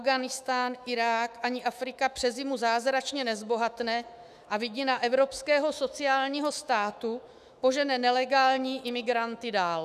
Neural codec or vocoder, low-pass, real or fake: autoencoder, 48 kHz, 128 numbers a frame, DAC-VAE, trained on Japanese speech; 14.4 kHz; fake